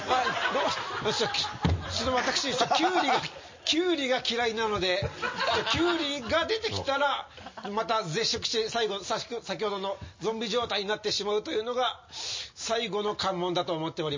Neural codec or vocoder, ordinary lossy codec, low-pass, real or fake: none; MP3, 32 kbps; 7.2 kHz; real